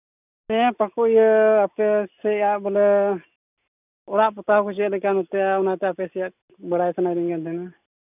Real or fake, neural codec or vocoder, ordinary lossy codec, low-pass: real; none; none; 3.6 kHz